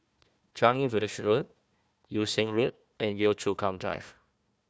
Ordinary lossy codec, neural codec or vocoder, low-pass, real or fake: none; codec, 16 kHz, 1 kbps, FunCodec, trained on Chinese and English, 50 frames a second; none; fake